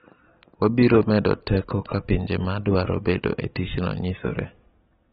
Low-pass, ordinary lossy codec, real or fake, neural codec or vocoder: 7.2 kHz; AAC, 16 kbps; real; none